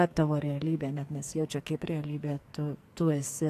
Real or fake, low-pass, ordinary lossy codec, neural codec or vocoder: fake; 14.4 kHz; AAC, 64 kbps; codec, 44.1 kHz, 2.6 kbps, SNAC